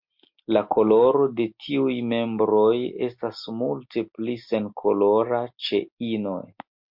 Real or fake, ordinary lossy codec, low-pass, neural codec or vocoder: real; MP3, 48 kbps; 5.4 kHz; none